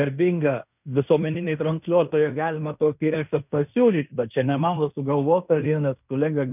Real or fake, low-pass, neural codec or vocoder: fake; 3.6 kHz; codec, 16 kHz in and 24 kHz out, 0.9 kbps, LongCat-Audio-Codec, fine tuned four codebook decoder